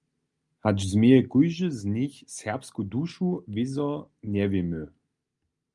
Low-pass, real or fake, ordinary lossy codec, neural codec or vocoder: 10.8 kHz; real; Opus, 32 kbps; none